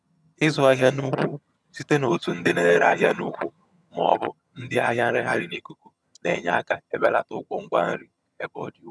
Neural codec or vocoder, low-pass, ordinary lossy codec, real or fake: vocoder, 22.05 kHz, 80 mel bands, HiFi-GAN; none; none; fake